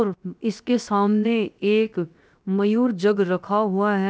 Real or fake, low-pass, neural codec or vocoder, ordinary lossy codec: fake; none; codec, 16 kHz, 0.7 kbps, FocalCodec; none